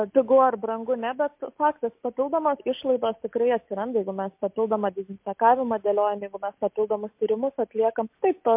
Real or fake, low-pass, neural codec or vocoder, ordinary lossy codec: real; 3.6 kHz; none; MP3, 32 kbps